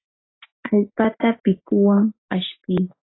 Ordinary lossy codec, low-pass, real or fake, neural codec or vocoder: AAC, 16 kbps; 7.2 kHz; real; none